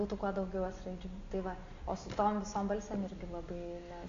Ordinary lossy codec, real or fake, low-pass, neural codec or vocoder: AAC, 48 kbps; real; 7.2 kHz; none